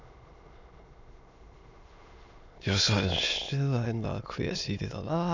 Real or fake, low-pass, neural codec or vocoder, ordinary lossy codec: fake; 7.2 kHz; autoencoder, 22.05 kHz, a latent of 192 numbers a frame, VITS, trained on many speakers; none